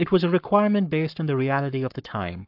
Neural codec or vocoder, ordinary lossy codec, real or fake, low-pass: codec, 44.1 kHz, 7.8 kbps, Pupu-Codec; AAC, 48 kbps; fake; 5.4 kHz